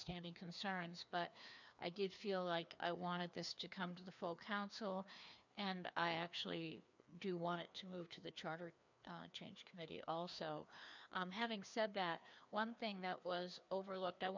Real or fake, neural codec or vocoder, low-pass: fake; codec, 16 kHz, 2 kbps, FreqCodec, larger model; 7.2 kHz